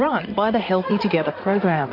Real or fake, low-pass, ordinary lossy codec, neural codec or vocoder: fake; 5.4 kHz; MP3, 48 kbps; codec, 16 kHz in and 24 kHz out, 2.2 kbps, FireRedTTS-2 codec